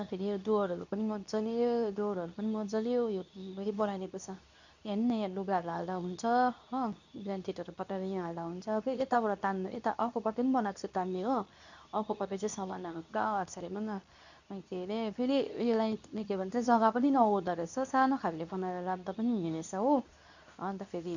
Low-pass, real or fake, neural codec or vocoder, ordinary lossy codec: 7.2 kHz; fake; codec, 24 kHz, 0.9 kbps, WavTokenizer, medium speech release version 2; none